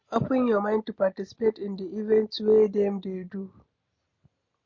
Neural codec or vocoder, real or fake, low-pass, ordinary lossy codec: none; real; 7.2 kHz; MP3, 48 kbps